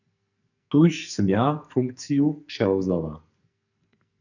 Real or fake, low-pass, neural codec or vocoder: fake; 7.2 kHz; codec, 44.1 kHz, 2.6 kbps, SNAC